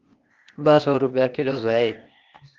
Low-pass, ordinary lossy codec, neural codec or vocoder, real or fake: 7.2 kHz; Opus, 32 kbps; codec, 16 kHz, 0.8 kbps, ZipCodec; fake